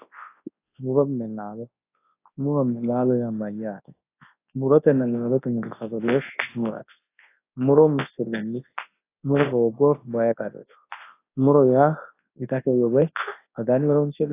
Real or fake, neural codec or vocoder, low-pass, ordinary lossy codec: fake; codec, 24 kHz, 0.9 kbps, WavTokenizer, large speech release; 3.6 kHz; AAC, 24 kbps